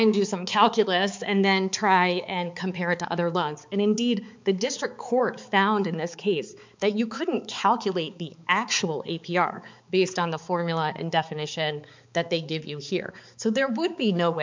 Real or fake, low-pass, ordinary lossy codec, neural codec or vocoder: fake; 7.2 kHz; MP3, 64 kbps; codec, 16 kHz, 4 kbps, X-Codec, HuBERT features, trained on balanced general audio